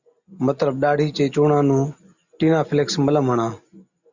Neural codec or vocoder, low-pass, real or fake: none; 7.2 kHz; real